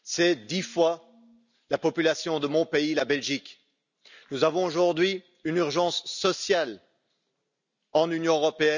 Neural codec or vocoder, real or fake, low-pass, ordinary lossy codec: none; real; 7.2 kHz; none